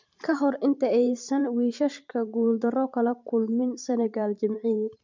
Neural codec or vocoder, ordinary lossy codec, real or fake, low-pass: vocoder, 44.1 kHz, 128 mel bands every 512 samples, BigVGAN v2; MP3, 64 kbps; fake; 7.2 kHz